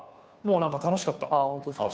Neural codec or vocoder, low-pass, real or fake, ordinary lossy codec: codec, 16 kHz, 2 kbps, FunCodec, trained on Chinese and English, 25 frames a second; none; fake; none